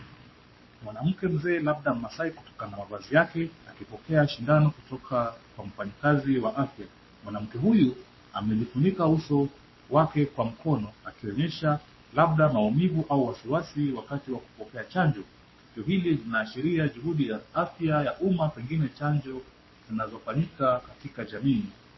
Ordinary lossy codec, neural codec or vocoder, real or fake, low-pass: MP3, 24 kbps; codec, 44.1 kHz, 7.8 kbps, Pupu-Codec; fake; 7.2 kHz